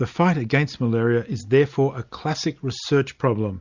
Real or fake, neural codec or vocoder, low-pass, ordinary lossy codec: real; none; 7.2 kHz; Opus, 64 kbps